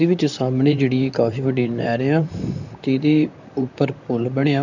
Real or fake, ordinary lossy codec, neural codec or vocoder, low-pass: fake; none; vocoder, 44.1 kHz, 128 mel bands, Pupu-Vocoder; 7.2 kHz